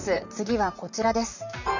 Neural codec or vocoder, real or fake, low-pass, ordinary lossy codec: vocoder, 44.1 kHz, 128 mel bands, Pupu-Vocoder; fake; 7.2 kHz; none